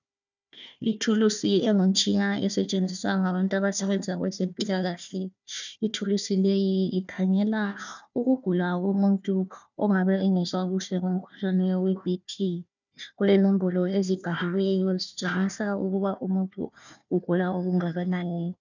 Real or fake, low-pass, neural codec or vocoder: fake; 7.2 kHz; codec, 16 kHz, 1 kbps, FunCodec, trained on Chinese and English, 50 frames a second